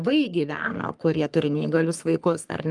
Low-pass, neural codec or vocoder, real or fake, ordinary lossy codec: 10.8 kHz; codec, 44.1 kHz, 3.4 kbps, Pupu-Codec; fake; Opus, 32 kbps